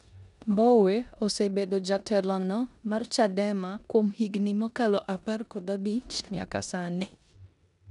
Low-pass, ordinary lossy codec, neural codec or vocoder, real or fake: 10.8 kHz; none; codec, 16 kHz in and 24 kHz out, 0.9 kbps, LongCat-Audio-Codec, four codebook decoder; fake